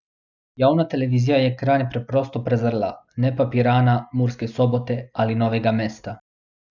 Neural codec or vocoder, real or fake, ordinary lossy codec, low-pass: none; real; none; 7.2 kHz